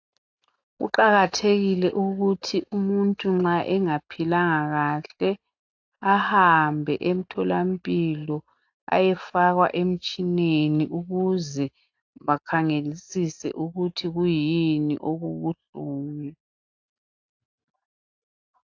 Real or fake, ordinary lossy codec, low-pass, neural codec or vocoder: real; AAC, 48 kbps; 7.2 kHz; none